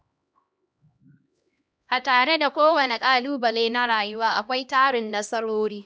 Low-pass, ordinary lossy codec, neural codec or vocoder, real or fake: none; none; codec, 16 kHz, 1 kbps, X-Codec, HuBERT features, trained on LibriSpeech; fake